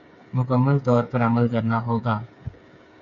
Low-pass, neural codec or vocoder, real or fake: 7.2 kHz; codec, 16 kHz, 8 kbps, FreqCodec, smaller model; fake